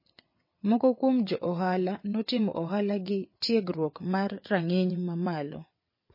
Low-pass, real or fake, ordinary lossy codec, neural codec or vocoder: 5.4 kHz; fake; MP3, 24 kbps; vocoder, 22.05 kHz, 80 mel bands, WaveNeXt